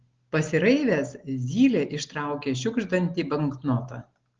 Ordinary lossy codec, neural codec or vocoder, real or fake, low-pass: Opus, 16 kbps; none; real; 7.2 kHz